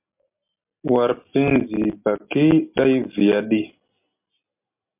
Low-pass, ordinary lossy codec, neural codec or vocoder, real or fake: 3.6 kHz; MP3, 32 kbps; none; real